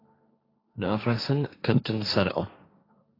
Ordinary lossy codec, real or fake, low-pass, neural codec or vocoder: AAC, 24 kbps; fake; 5.4 kHz; codec, 16 kHz, 1.1 kbps, Voila-Tokenizer